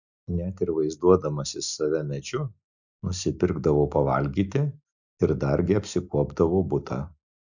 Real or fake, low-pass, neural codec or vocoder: real; 7.2 kHz; none